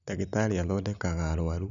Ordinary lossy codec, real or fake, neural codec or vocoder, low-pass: none; real; none; 7.2 kHz